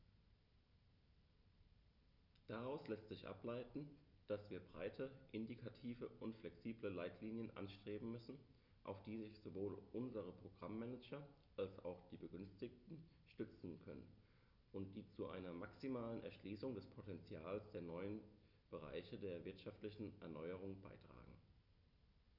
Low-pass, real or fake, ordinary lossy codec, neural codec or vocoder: 5.4 kHz; real; none; none